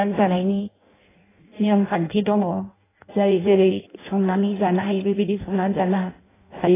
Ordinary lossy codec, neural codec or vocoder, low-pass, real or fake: AAC, 16 kbps; codec, 16 kHz in and 24 kHz out, 0.6 kbps, FireRedTTS-2 codec; 3.6 kHz; fake